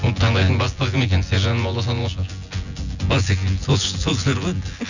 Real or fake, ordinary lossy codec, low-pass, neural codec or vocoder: fake; none; 7.2 kHz; vocoder, 24 kHz, 100 mel bands, Vocos